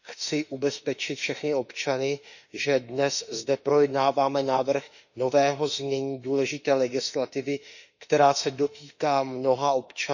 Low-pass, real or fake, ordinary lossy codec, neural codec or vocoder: 7.2 kHz; fake; none; autoencoder, 48 kHz, 32 numbers a frame, DAC-VAE, trained on Japanese speech